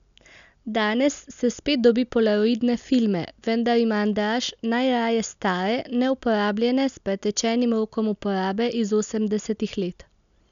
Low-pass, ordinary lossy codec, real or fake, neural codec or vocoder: 7.2 kHz; none; real; none